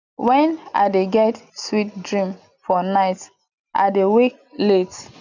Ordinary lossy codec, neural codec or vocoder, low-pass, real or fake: none; none; 7.2 kHz; real